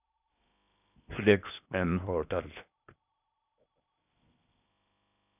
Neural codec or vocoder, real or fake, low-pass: codec, 16 kHz in and 24 kHz out, 0.8 kbps, FocalCodec, streaming, 65536 codes; fake; 3.6 kHz